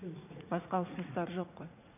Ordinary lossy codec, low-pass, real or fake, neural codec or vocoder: none; 3.6 kHz; fake; vocoder, 44.1 kHz, 80 mel bands, Vocos